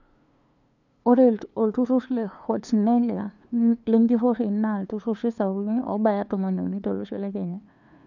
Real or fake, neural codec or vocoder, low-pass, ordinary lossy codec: fake; codec, 16 kHz, 2 kbps, FunCodec, trained on LibriTTS, 25 frames a second; 7.2 kHz; none